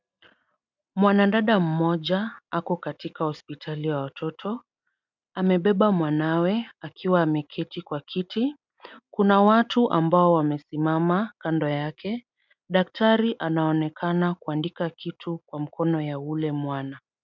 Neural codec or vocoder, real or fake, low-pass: none; real; 7.2 kHz